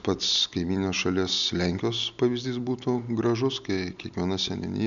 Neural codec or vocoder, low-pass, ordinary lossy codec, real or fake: none; 7.2 kHz; MP3, 96 kbps; real